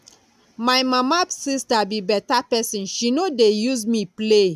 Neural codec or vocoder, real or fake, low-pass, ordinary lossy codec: none; real; 14.4 kHz; none